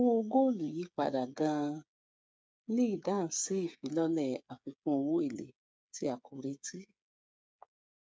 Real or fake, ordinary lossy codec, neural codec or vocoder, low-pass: fake; none; codec, 16 kHz, 8 kbps, FreqCodec, smaller model; none